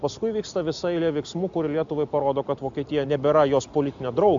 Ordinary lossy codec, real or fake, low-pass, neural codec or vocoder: AAC, 64 kbps; real; 7.2 kHz; none